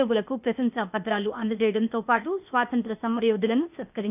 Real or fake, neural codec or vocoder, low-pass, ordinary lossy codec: fake; codec, 16 kHz, 0.8 kbps, ZipCodec; 3.6 kHz; none